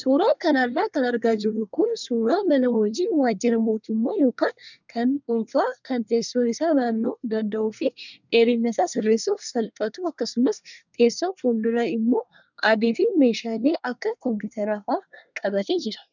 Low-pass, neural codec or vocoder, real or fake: 7.2 kHz; codec, 24 kHz, 1 kbps, SNAC; fake